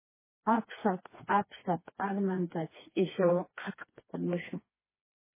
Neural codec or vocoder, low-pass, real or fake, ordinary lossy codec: codec, 16 kHz, 2 kbps, FreqCodec, smaller model; 3.6 kHz; fake; MP3, 16 kbps